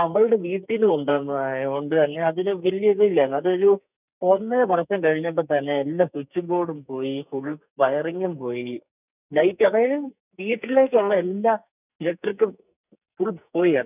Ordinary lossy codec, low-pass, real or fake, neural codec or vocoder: none; 3.6 kHz; fake; codec, 44.1 kHz, 2.6 kbps, SNAC